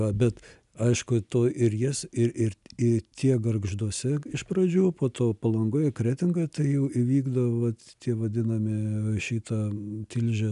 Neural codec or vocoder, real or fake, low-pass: none; real; 10.8 kHz